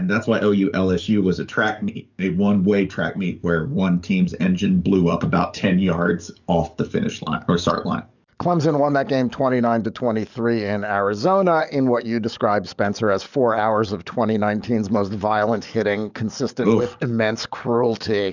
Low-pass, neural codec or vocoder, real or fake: 7.2 kHz; codec, 44.1 kHz, 7.8 kbps, DAC; fake